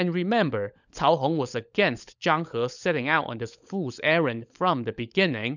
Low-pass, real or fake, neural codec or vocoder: 7.2 kHz; fake; codec, 16 kHz, 16 kbps, FunCodec, trained on LibriTTS, 50 frames a second